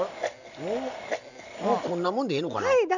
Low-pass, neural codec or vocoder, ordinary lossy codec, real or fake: 7.2 kHz; none; none; real